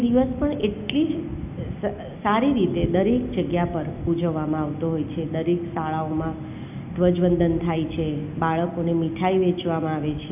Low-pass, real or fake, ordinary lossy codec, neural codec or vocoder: 3.6 kHz; real; AAC, 32 kbps; none